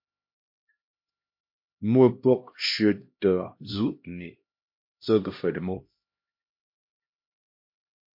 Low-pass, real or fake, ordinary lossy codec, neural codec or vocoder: 5.4 kHz; fake; MP3, 32 kbps; codec, 16 kHz, 1 kbps, X-Codec, HuBERT features, trained on LibriSpeech